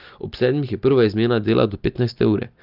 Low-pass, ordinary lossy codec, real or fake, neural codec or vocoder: 5.4 kHz; Opus, 24 kbps; real; none